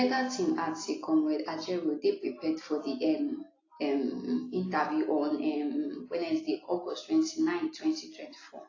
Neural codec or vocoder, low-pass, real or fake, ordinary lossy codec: none; 7.2 kHz; real; AAC, 32 kbps